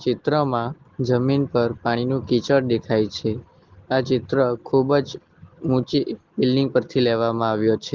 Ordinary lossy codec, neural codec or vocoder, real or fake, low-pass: Opus, 16 kbps; none; real; 7.2 kHz